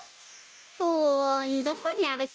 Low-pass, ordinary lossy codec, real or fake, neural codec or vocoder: none; none; fake; codec, 16 kHz, 0.5 kbps, FunCodec, trained on Chinese and English, 25 frames a second